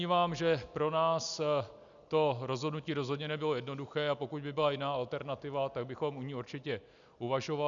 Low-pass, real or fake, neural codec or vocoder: 7.2 kHz; real; none